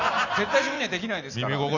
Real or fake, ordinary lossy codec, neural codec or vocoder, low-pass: real; none; none; 7.2 kHz